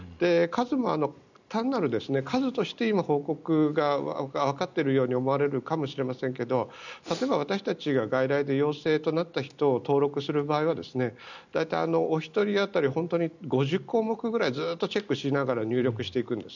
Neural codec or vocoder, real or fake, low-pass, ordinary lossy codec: none; real; 7.2 kHz; none